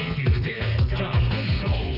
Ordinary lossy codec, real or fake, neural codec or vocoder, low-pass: none; fake; codec, 32 kHz, 1.9 kbps, SNAC; 5.4 kHz